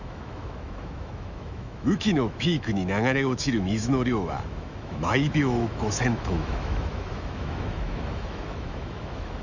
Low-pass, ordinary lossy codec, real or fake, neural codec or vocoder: 7.2 kHz; none; fake; autoencoder, 48 kHz, 128 numbers a frame, DAC-VAE, trained on Japanese speech